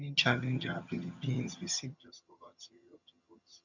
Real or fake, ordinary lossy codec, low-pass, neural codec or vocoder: fake; none; 7.2 kHz; vocoder, 22.05 kHz, 80 mel bands, HiFi-GAN